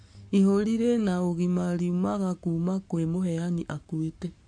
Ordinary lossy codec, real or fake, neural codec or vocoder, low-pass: MP3, 48 kbps; fake; codec, 44.1 kHz, 7.8 kbps, Pupu-Codec; 9.9 kHz